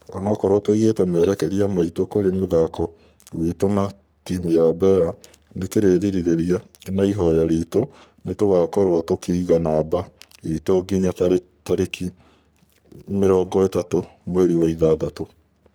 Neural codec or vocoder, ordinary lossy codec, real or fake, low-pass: codec, 44.1 kHz, 3.4 kbps, Pupu-Codec; none; fake; none